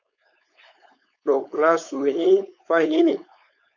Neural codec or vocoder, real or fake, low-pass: codec, 16 kHz, 4.8 kbps, FACodec; fake; 7.2 kHz